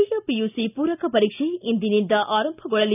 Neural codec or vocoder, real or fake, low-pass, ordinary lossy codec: none; real; 3.6 kHz; none